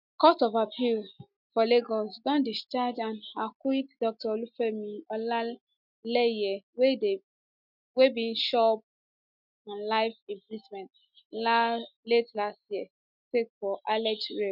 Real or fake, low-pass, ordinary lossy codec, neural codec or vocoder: real; 5.4 kHz; none; none